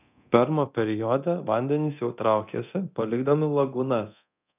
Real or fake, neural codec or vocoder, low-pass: fake; codec, 24 kHz, 0.9 kbps, DualCodec; 3.6 kHz